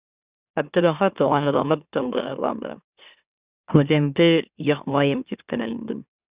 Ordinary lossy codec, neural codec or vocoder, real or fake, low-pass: Opus, 64 kbps; autoencoder, 44.1 kHz, a latent of 192 numbers a frame, MeloTTS; fake; 3.6 kHz